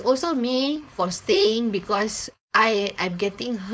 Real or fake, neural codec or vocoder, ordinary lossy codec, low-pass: fake; codec, 16 kHz, 4.8 kbps, FACodec; none; none